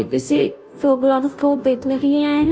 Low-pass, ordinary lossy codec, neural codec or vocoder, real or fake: none; none; codec, 16 kHz, 0.5 kbps, FunCodec, trained on Chinese and English, 25 frames a second; fake